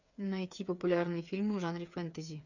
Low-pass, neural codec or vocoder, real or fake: 7.2 kHz; codec, 16 kHz, 8 kbps, FreqCodec, smaller model; fake